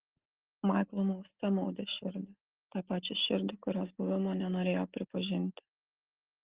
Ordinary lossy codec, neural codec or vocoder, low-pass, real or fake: Opus, 16 kbps; none; 3.6 kHz; real